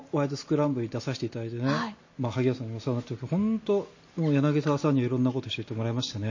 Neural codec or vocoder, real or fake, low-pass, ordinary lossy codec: none; real; 7.2 kHz; MP3, 32 kbps